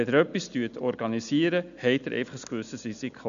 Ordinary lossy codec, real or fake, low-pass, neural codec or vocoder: none; real; 7.2 kHz; none